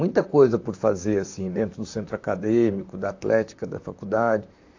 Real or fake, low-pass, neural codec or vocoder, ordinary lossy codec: fake; 7.2 kHz; vocoder, 44.1 kHz, 128 mel bands, Pupu-Vocoder; AAC, 48 kbps